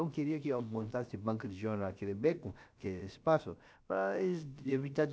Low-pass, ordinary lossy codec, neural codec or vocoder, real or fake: none; none; codec, 16 kHz, about 1 kbps, DyCAST, with the encoder's durations; fake